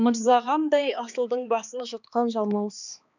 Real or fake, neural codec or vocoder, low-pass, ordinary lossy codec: fake; codec, 16 kHz, 2 kbps, X-Codec, HuBERT features, trained on balanced general audio; 7.2 kHz; none